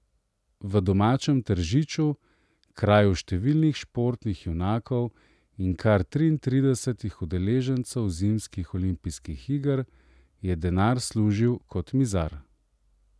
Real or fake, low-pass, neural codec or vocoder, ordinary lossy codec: real; none; none; none